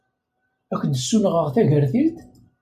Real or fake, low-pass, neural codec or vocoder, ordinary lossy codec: real; 14.4 kHz; none; MP3, 96 kbps